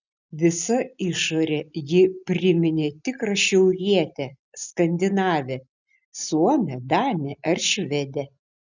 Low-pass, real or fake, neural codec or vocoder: 7.2 kHz; real; none